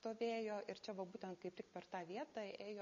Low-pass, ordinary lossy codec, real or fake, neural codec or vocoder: 7.2 kHz; MP3, 32 kbps; real; none